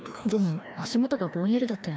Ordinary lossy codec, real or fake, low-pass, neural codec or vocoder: none; fake; none; codec, 16 kHz, 1 kbps, FreqCodec, larger model